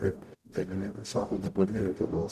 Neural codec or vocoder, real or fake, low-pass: codec, 44.1 kHz, 0.9 kbps, DAC; fake; 14.4 kHz